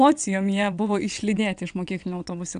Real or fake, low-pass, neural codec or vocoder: fake; 9.9 kHz; vocoder, 22.05 kHz, 80 mel bands, WaveNeXt